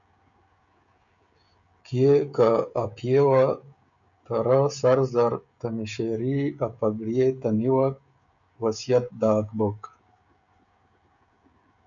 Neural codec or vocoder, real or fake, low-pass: codec, 16 kHz, 16 kbps, FreqCodec, smaller model; fake; 7.2 kHz